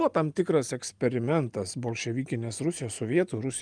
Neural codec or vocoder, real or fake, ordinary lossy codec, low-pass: none; real; Opus, 32 kbps; 9.9 kHz